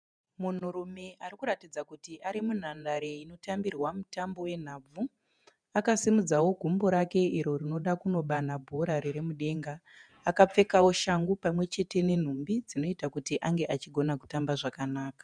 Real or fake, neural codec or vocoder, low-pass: fake; vocoder, 48 kHz, 128 mel bands, Vocos; 9.9 kHz